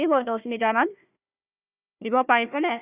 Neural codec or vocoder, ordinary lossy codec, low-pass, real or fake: codec, 16 kHz, 1 kbps, FunCodec, trained on Chinese and English, 50 frames a second; Opus, 64 kbps; 3.6 kHz; fake